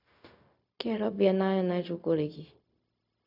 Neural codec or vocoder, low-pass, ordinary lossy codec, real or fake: codec, 16 kHz, 0.4 kbps, LongCat-Audio-Codec; 5.4 kHz; none; fake